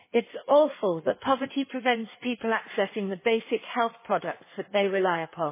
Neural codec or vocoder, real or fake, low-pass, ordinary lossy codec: codec, 16 kHz in and 24 kHz out, 1.1 kbps, FireRedTTS-2 codec; fake; 3.6 kHz; MP3, 16 kbps